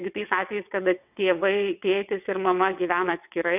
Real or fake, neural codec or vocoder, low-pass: fake; vocoder, 22.05 kHz, 80 mel bands, WaveNeXt; 3.6 kHz